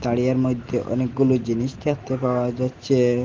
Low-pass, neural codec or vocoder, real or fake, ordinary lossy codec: 7.2 kHz; none; real; Opus, 16 kbps